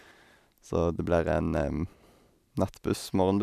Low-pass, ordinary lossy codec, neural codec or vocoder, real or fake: 14.4 kHz; none; none; real